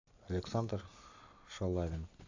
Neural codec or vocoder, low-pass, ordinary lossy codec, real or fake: none; 7.2 kHz; AAC, 48 kbps; real